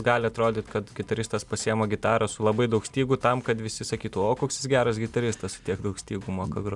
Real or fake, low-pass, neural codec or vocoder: real; 10.8 kHz; none